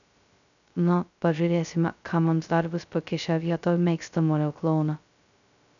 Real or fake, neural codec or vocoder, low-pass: fake; codec, 16 kHz, 0.2 kbps, FocalCodec; 7.2 kHz